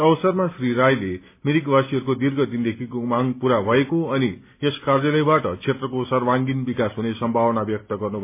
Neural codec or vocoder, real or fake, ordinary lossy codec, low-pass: none; real; MP3, 24 kbps; 3.6 kHz